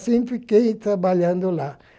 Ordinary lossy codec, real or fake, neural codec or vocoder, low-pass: none; real; none; none